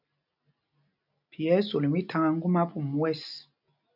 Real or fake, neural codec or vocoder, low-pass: real; none; 5.4 kHz